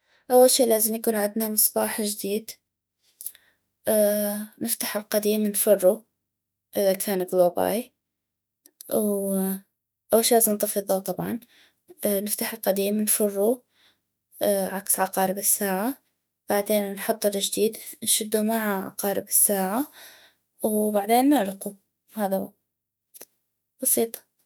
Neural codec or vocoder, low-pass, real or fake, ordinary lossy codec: autoencoder, 48 kHz, 32 numbers a frame, DAC-VAE, trained on Japanese speech; none; fake; none